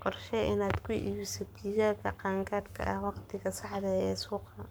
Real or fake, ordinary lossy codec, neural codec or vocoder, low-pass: fake; none; codec, 44.1 kHz, 7.8 kbps, Pupu-Codec; none